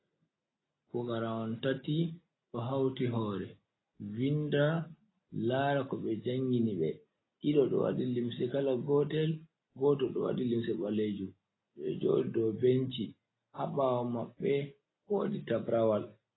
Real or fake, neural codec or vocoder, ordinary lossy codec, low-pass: real; none; AAC, 16 kbps; 7.2 kHz